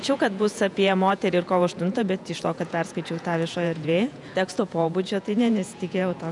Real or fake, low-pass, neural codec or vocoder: fake; 10.8 kHz; vocoder, 48 kHz, 128 mel bands, Vocos